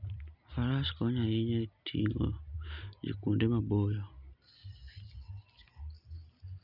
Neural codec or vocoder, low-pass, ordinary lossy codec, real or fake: none; 5.4 kHz; none; real